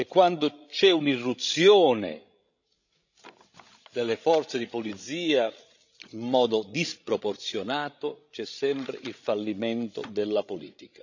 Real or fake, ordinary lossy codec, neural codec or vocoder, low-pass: fake; none; codec, 16 kHz, 16 kbps, FreqCodec, larger model; 7.2 kHz